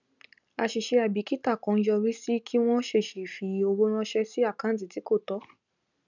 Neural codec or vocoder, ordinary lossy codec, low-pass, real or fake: none; none; 7.2 kHz; real